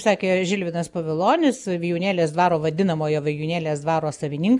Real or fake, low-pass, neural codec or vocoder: real; 10.8 kHz; none